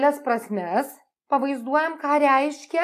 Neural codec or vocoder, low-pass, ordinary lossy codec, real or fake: none; 14.4 kHz; AAC, 64 kbps; real